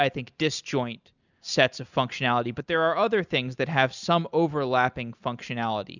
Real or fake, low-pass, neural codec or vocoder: real; 7.2 kHz; none